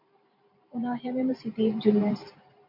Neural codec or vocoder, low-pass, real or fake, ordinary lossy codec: vocoder, 44.1 kHz, 128 mel bands every 512 samples, BigVGAN v2; 5.4 kHz; fake; MP3, 32 kbps